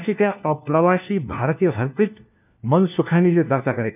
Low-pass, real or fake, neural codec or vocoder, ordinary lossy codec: 3.6 kHz; fake; codec, 16 kHz, 1 kbps, FunCodec, trained on LibriTTS, 50 frames a second; none